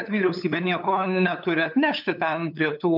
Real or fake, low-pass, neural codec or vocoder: fake; 5.4 kHz; codec, 16 kHz, 8 kbps, FunCodec, trained on LibriTTS, 25 frames a second